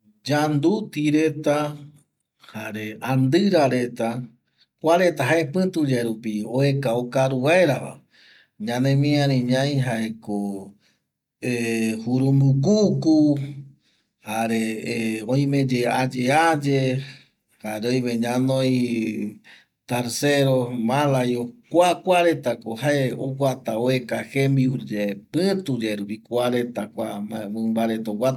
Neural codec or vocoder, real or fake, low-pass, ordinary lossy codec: none; real; 19.8 kHz; none